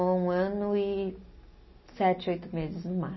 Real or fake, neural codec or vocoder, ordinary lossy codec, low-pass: real; none; MP3, 24 kbps; 7.2 kHz